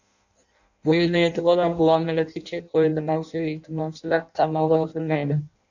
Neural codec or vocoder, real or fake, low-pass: codec, 16 kHz in and 24 kHz out, 0.6 kbps, FireRedTTS-2 codec; fake; 7.2 kHz